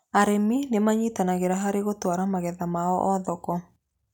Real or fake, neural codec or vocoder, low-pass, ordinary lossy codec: real; none; 19.8 kHz; none